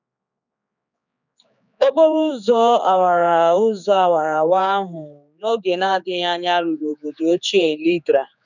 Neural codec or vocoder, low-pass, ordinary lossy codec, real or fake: codec, 16 kHz, 4 kbps, X-Codec, HuBERT features, trained on general audio; 7.2 kHz; none; fake